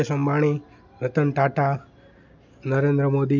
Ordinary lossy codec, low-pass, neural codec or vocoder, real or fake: none; 7.2 kHz; none; real